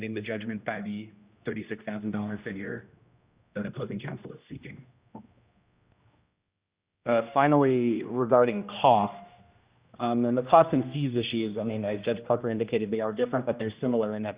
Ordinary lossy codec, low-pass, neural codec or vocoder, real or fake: Opus, 64 kbps; 3.6 kHz; codec, 16 kHz, 1 kbps, X-Codec, HuBERT features, trained on general audio; fake